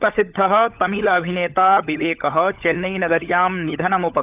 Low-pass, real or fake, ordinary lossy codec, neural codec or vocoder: 3.6 kHz; fake; Opus, 24 kbps; codec, 16 kHz, 16 kbps, FunCodec, trained on LibriTTS, 50 frames a second